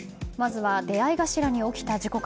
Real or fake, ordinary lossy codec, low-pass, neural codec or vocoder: real; none; none; none